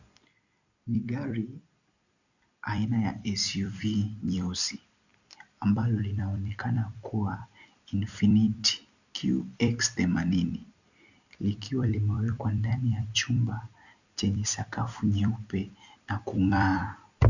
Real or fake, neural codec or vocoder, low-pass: fake; vocoder, 44.1 kHz, 128 mel bands every 256 samples, BigVGAN v2; 7.2 kHz